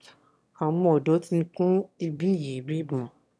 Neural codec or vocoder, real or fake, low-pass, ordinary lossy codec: autoencoder, 22.05 kHz, a latent of 192 numbers a frame, VITS, trained on one speaker; fake; none; none